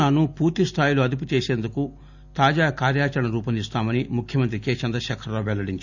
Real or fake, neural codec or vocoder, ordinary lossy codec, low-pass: real; none; none; 7.2 kHz